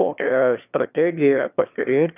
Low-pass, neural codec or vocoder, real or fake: 3.6 kHz; autoencoder, 22.05 kHz, a latent of 192 numbers a frame, VITS, trained on one speaker; fake